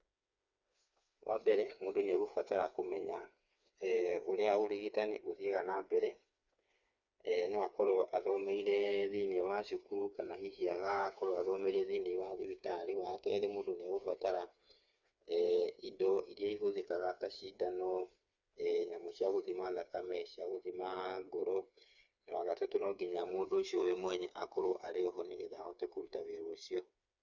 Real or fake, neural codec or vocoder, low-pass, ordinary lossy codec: fake; codec, 16 kHz, 4 kbps, FreqCodec, smaller model; 7.2 kHz; Opus, 64 kbps